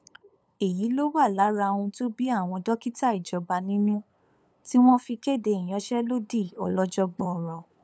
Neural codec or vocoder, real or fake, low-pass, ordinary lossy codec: codec, 16 kHz, 8 kbps, FunCodec, trained on LibriTTS, 25 frames a second; fake; none; none